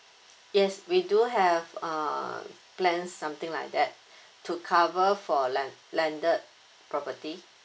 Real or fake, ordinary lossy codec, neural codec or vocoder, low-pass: real; none; none; none